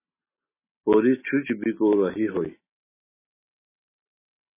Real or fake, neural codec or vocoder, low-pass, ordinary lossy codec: real; none; 3.6 kHz; MP3, 16 kbps